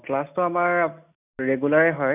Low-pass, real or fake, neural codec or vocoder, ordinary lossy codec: 3.6 kHz; real; none; none